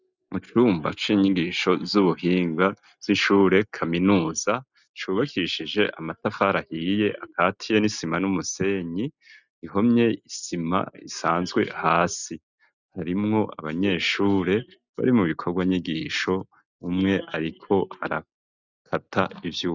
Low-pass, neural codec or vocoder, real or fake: 7.2 kHz; none; real